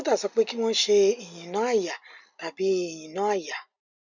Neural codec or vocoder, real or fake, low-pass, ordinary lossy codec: none; real; 7.2 kHz; none